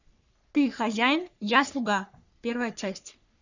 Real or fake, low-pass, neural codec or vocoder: fake; 7.2 kHz; codec, 44.1 kHz, 3.4 kbps, Pupu-Codec